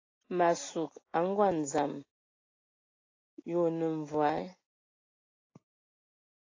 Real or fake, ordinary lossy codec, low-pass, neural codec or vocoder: real; AAC, 32 kbps; 7.2 kHz; none